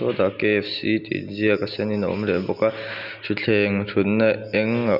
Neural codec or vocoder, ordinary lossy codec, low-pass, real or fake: none; none; 5.4 kHz; real